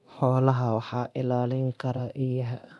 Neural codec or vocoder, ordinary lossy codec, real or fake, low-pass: codec, 24 kHz, 0.9 kbps, DualCodec; none; fake; none